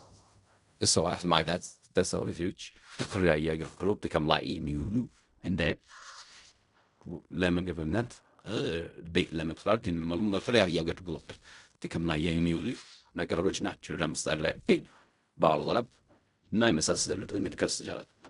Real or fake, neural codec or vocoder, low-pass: fake; codec, 16 kHz in and 24 kHz out, 0.4 kbps, LongCat-Audio-Codec, fine tuned four codebook decoder; 10.8 kHz